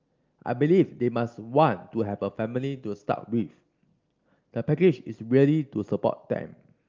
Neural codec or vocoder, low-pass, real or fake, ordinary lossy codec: none; 7.2 kHz; real; Opus, 24 kbps